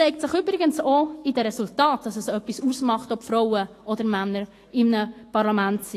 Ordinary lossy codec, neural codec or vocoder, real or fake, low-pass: AAC, 48 kbps; autoencoder, 48 kHz, 128 numbers a frame, DAC-VAE, trained on Japanese speech; fake; 14.4 kHz